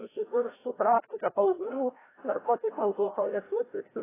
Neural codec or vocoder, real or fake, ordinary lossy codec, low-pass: codec, 16 kHz, 0.5 kbps, FreqCodec, larger model; fake; AAC, 16 kbps; 3.6 kHz